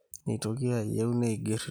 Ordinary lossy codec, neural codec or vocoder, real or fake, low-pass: none; none; real; none